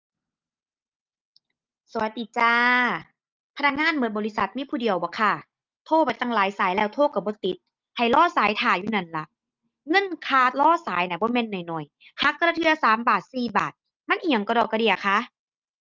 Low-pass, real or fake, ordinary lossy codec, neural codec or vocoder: 7.2 kHz; real; Opus, 32 kbps; none